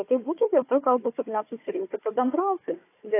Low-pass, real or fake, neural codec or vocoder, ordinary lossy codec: 3.6 kHz; fake; codec, 16 kHz, 4 kbps, FunCodec, trained on Chinese and English, 50 frames a second; AAC, 24 kbps